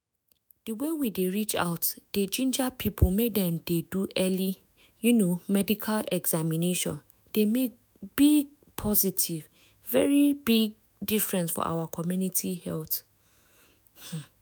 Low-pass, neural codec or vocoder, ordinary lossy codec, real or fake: none; autoencoder, 48 kHz, 128 numbers a frame, DAC-VAE, trained on Japanese speech; none; fake